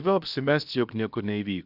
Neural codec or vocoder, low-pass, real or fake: codec, 16 kHz, about 1 kbps, DyCAST, with the encoder's durations; 5.4 kHz; fake